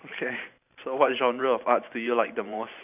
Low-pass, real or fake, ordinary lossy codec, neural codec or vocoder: 3.6 kHz; fake; none; vocoder, 44.1 kHz, 128 mel bands every 512 samples, BigVGAN v2